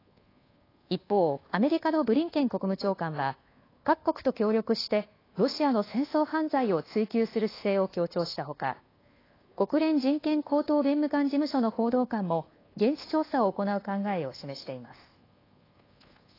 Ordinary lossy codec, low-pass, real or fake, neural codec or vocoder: AAC, 24 kbps; 5.4 kHz; fake; codec, 24 kHz, 1.2 kbps, DualCodec